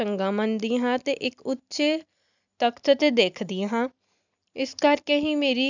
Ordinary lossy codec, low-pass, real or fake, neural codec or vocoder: none; 7.2 kHz; real; none